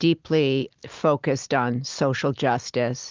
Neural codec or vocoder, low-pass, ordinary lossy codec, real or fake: none; 7.2 kHz; Opus, 32 kbps; real